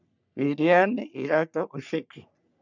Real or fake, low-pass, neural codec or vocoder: fake; 7.2 kHz; codec, 44.1 kHz, 3.4 kbps, Pupu-Codec